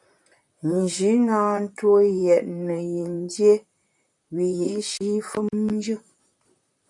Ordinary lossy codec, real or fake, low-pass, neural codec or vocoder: MP3, 96 kbps; fake; 10.8 kHz; vocoder, 44.1 kHz, 128 mel bands, Pupu-Vocoder